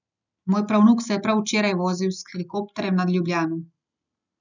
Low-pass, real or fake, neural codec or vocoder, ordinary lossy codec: 7.2 kHz; real; none; none